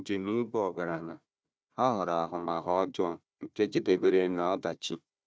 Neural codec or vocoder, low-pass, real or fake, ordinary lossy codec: codec, 16 kHz, 1 kbps, FunCodec, trained on Chinese and English, 50 frames a second; none; fake; none